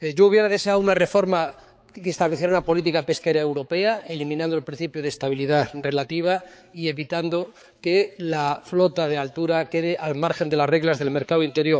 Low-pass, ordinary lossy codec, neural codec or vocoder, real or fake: none; none; codec, 16 kHz, 4 kbps, X-Codec, HuBERT features, trained on balanced general audio; fake